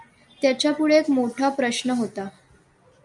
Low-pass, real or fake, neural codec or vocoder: 10.8 kHz; real; none